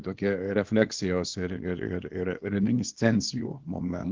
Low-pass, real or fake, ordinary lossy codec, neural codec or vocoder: 7.2 kHz; fake; Opus, 16 kbps; codec, 24 kHz, 0.9 kbps, WavTokenizer, small release